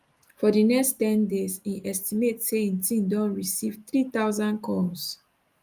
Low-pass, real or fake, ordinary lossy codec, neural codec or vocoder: 14.4 kHz; real; Opus, 32 kbps; none